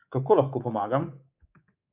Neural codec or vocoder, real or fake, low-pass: codec, 24 kHz, 3.1 kbps, DualCodec; fake; 3.6 kHz